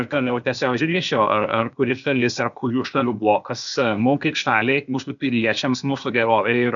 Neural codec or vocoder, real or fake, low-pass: codec, 16 kHz, 0.8 kbps, ZipCodec; fake; 7.2 kHz